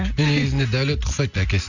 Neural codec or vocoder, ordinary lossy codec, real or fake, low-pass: none; none; real; 7.2 kHz